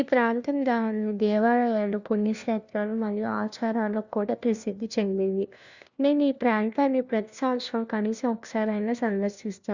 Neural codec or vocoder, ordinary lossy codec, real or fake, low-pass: codec, 16 kHz, 1 kbps, FunCodec, trained on LibriTTS, 50 frames a second; Opus, 64 kbps; fake; 7.2 kHz